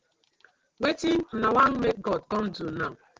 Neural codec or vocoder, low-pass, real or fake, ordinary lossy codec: none; 7.2 kHz; real; Opus, 16 kbps